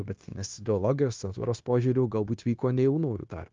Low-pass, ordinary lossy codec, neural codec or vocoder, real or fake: 7.2 kHz; Opus, 32 kbps; codec, 16 kHz, 0.9 kbps, LongCat-Audio-Codec; fake